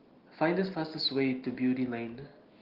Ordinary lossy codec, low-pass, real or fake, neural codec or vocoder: Opus, 16 kbps; 5.4 kHz; real; none